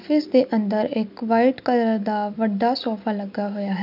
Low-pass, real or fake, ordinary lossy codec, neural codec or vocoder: 5.4 kHz; real; none; none